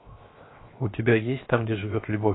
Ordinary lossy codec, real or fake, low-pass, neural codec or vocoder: AAC, 16 kbps; fake; 7.2 kHz; codec, 16 kHz, 0.7 kbps, FocalCodec